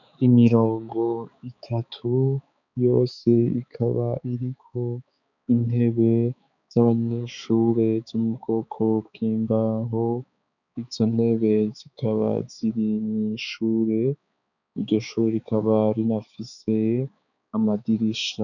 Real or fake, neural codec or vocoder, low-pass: fake; codec, 16 kHz, 4 kbps, X-Codec, HuBERT features, trained on balanced general audio; 7.2 kHz